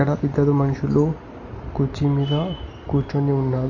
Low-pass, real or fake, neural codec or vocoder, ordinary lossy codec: 7.2 kHz; real; none; AAC, 48 kbps